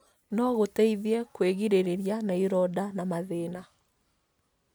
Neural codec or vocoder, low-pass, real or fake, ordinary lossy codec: none; none; real; none